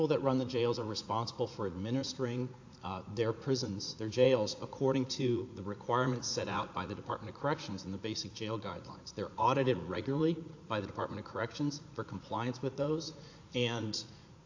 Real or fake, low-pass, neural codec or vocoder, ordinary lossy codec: fake; 7.2 kHz; vocoder, 44.1 kHz, 80 mel bands, Vocos; AAC, 48 kbps